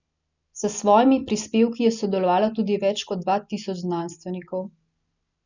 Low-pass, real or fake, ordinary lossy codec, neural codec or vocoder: 7.2 kHz; real; none; none